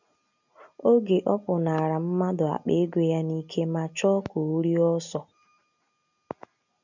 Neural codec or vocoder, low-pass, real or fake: none; 7.2 kHz; real